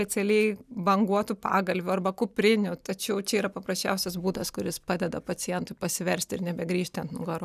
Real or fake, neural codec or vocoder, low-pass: real; none; 14.4 kHz